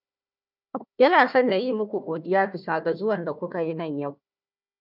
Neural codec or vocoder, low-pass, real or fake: codec, 16 kHz, 1 kbps, FunCodec, trained on Chinese and English, 50 frames a second; 5.4 kHz; fake